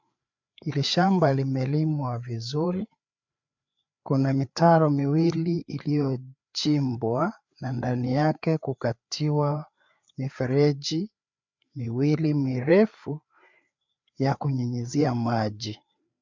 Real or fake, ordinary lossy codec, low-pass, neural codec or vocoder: fake; MP3, 64 kbps; 7.2 kHz; codec, 16 kHz, 4 kbps, FreqCodec, larger model